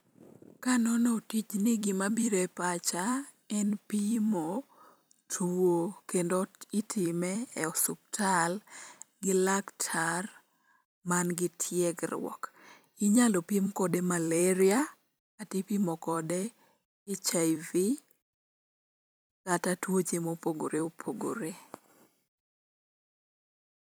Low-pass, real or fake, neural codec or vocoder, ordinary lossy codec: none; fake; vocoder, 44.1 kHz, 128 mel bands every 256 samples, BigVGAN v2; none